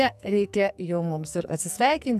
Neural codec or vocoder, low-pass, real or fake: codec, 44.1 kHz, 2.6 kbps, SNAC; 14.4 kHz; fake